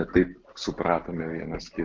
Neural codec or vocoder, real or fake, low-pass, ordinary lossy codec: none; real; 7.2 kHz; Opus, 32 kbps